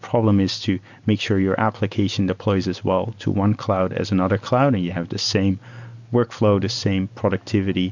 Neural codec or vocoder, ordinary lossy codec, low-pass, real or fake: none; MP3, 64 kbps; 7.2 kHz; real